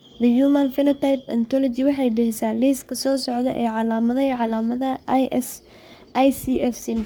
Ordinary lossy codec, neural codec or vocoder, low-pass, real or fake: none; codec, 44.1 kHz, 3.4 kbps, Pupu-Codec; none; fake